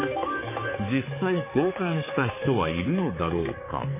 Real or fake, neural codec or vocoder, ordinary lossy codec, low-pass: fake; codec, 16 kHz, 4 kbps, X-Codec, HuBERT features, trained on balanced general audio; MP3, 16 kbps; 3.6 kHz